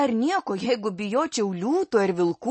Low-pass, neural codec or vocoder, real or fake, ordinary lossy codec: 10.8 kHz; none; real; MP3, 32 kbps